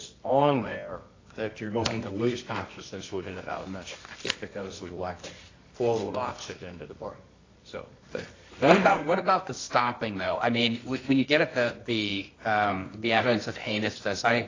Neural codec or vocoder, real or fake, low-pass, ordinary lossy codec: codec, 24 kHz, 0.9 kbps, WavTokenizer, medium music audio release; fake; 7.2 kHz; AAC, 32 kbps